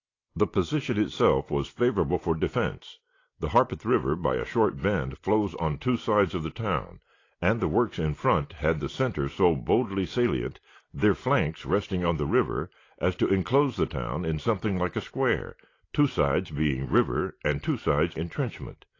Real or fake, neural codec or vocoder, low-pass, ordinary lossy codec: real; none; 7.2 kHz; AAC, 32 kbps